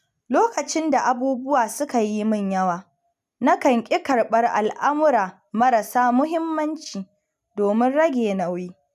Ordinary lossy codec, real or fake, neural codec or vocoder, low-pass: none; real; none; 14.4 kHz